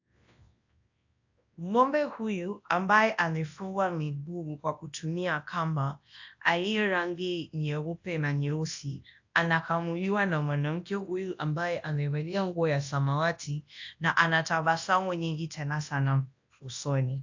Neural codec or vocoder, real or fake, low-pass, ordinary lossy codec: codec, 24 kHz, 0.9 kbps, WavTokenizer, large speech release; fake; 7.2 kHz; AAC, 48 kbps